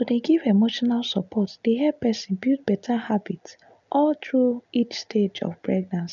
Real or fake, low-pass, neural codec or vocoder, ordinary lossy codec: real; 7.2 kHz; none; none